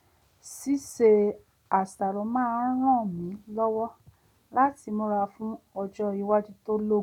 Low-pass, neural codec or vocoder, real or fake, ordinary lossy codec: 19.8 kHz; none; real; none